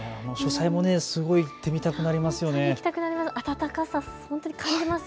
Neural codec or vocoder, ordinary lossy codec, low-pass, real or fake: none; none; none; real